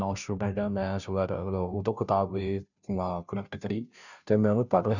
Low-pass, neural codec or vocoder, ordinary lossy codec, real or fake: 7.2 kHz; codec, 16 kHz, 0.5 kbps, FunCodec, trained on Chinese and English, 25 frames a second; none; fake